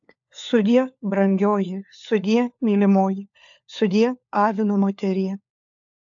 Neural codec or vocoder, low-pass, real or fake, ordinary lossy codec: codec, 16 kHz, 4 kbps, FunCodec, trained on LibriTTS, 50 frames a second; 7.2 kHz; fake; AAC, 64 kbps